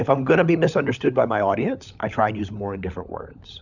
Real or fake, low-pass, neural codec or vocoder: fake; 7.2 kHz; codec, 16 kHz, 16 kbps, FunCodec, trained on LibriTTS, 50 frames a second